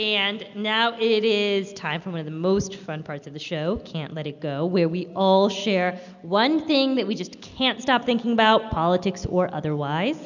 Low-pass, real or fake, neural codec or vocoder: 7.2 kHz; real; none